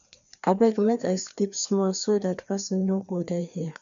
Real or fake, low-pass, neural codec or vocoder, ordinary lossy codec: fake; 7.2 kHz; codec, 16 kHz, 2 kbps, FreqCodec, larger model; none